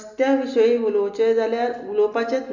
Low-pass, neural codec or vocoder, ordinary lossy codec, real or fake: 7.2 kHz; none; none; real